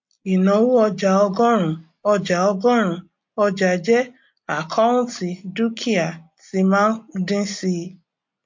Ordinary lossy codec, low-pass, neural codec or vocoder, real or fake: MP3, 48 kbps; 7.2 kHz; none; real